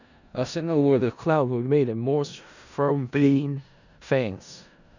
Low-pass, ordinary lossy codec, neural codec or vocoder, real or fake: 7.2 kHz; none; codec, 16 kHz in and 24 kHz out, 0.4 kbps, LongCat-Audio-Codec, four codebook decoder; fake